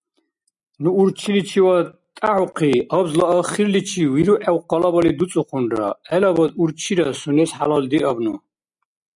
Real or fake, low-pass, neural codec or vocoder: real; 10.8 kHz; none